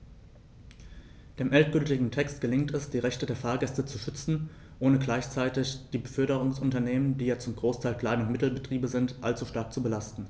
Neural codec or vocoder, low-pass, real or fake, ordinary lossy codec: none; none; real; none